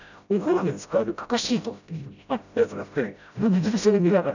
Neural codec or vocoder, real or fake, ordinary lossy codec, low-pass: codec, 16 kHz, 0.5 kbps, FreqCodec, smaller model; fake; none; 7.2 kHz